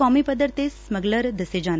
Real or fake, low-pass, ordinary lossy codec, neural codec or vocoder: real; none; none; none